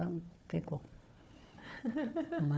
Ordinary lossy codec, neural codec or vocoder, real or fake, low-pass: none; codec, 16 kHz, 4 kbps, FunCodec, trained on Chinese and English, 50 frames a second; fake; none